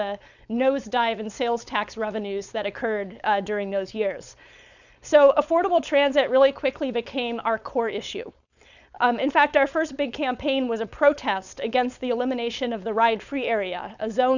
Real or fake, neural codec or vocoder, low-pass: fake; codec, 16 kHz, 4.8 kbps, FACodec; 7.2 kHz